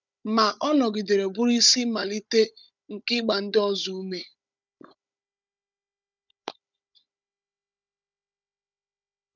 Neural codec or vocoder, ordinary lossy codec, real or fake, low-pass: codec, 16 kHz, 16 kbps, FunCodec, trained on Chinese and English, 50 frames a second; none; fake; 7.2 kHz